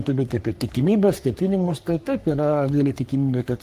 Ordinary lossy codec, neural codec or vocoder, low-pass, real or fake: Opus, 24 kbps; codec, 44.1 kHz, 3.4 kbps, Pupu-Codec; 14.4 kHz; fake